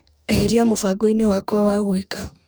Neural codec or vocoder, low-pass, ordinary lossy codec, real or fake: codec, 44.1 kHz, 2.6 kbps, DAC; none; none; fake